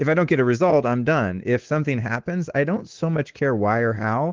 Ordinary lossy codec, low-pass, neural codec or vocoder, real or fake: Opus, 16 kbps; 7.2 kHz; codec, 24 kHz, 3.1 kbps, DualCodec; fake